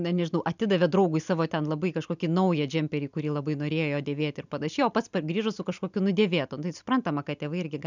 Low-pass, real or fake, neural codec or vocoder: 7.2 kHz; real; none